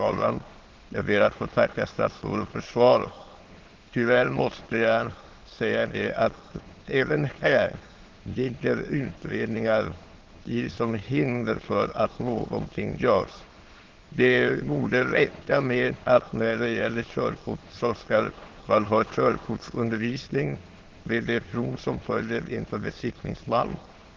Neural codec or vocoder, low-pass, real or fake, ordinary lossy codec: autoencoder, 22.05 kHz, a latent of 192 numbers a frame, VITS, trained on many speakers; 7.2 kHz; fake; Opus, 16 kbps